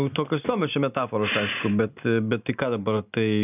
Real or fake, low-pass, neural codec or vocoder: real; 3.6 kHz; none